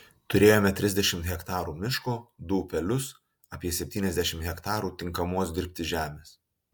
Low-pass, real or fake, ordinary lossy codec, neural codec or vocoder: 19.8 kHz; real; MP3, 96 kbps; none